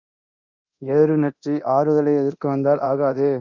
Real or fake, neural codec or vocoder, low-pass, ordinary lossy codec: fake; codec, 24 kHz, 0.9 kbps, DualCodec; 7.2 kHz; Opus, 64 kbps